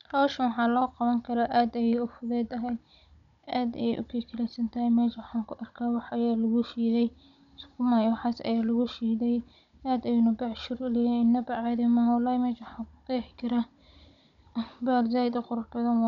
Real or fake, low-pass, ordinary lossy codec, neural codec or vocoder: fake; 7.2 kHz; none; codec, 16 kHz, 16 kbps, FunCodec, trained on Chinese and English, 50 frames a second